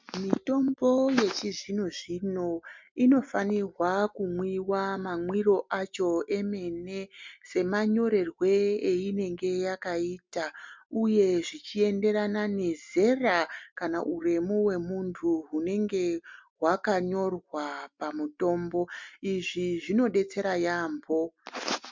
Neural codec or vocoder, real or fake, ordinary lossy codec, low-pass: none; real; MP3, 64 kbps; 7.2 kHz